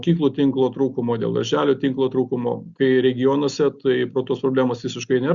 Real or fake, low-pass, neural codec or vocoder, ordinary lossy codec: real; 7.2 kHz; none; Opus, 64 kbps